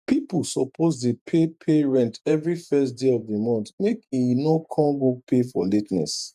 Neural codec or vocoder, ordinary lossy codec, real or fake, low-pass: vocoder, 48 kHz, 128 mel bands, Vocos; none; fake; 14.4 kHz